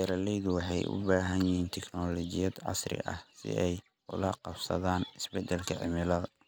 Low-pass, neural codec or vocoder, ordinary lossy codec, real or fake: none; none; none; real